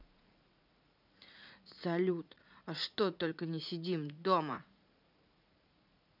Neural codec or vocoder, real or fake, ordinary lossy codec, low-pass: vocoder, 44.1 kHz, 80 mel bands, Vocos; fake; none; 5.4 kHz